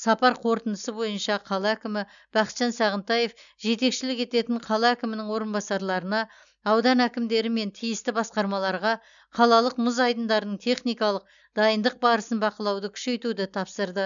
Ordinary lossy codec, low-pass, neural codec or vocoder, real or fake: none; 7.2 kHz; none; real